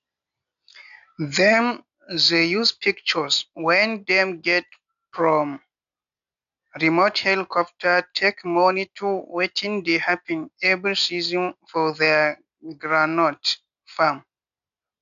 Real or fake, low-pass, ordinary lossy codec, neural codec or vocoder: real; 7.2 kHz; none; none